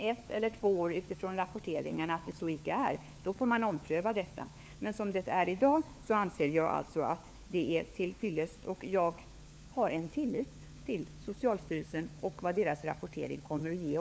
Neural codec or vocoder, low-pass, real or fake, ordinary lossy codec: codec, 16 kHz, 4 kbps, FunCodec, trained on LibriTTS, 50 frames a second; none; fake; none